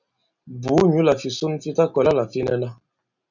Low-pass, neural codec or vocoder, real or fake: 7.2 kHz; none; real